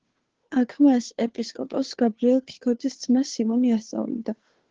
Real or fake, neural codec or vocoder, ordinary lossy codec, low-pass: fake; codec, 16 kHz, 2 kbps, FunCodec, trained on Chinese and English, 25 frames a second; Opus, 16 kbps; 7.2 kHz